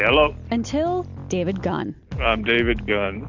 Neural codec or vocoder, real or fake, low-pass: none; real; 7.2 kHz